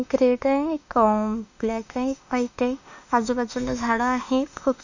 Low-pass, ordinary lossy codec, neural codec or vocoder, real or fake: 7.2 kHz; none; autoencoder, 48 kHz, 32 numbers a frame, DAC-VAE, trained on Japanese speech; fake